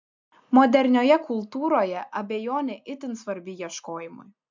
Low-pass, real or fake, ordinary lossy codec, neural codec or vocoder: 7.2 kHz; real; MP3, 64 kbps; none